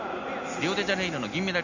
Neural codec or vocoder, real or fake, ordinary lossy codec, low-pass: none; real; none; 7.2 kHz